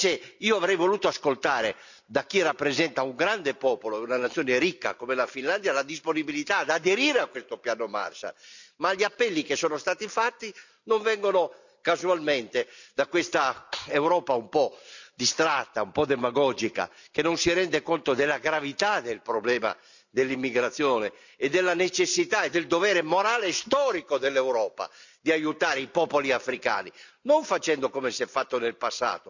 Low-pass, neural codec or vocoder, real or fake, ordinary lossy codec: 7.2 kHz; none; real; none